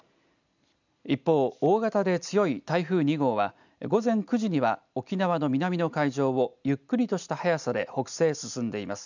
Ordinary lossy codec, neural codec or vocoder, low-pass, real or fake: none; none; 7.2 kHz; real